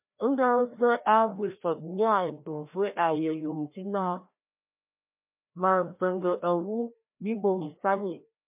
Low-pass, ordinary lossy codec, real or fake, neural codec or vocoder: 3.6 kHz; MP3, 32 kbps; fake; codec, 16 kHz, 1 kbps, FreqCodec, larger model